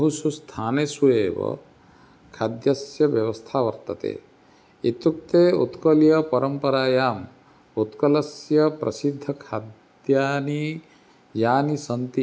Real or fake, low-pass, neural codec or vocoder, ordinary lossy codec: real; none; none; none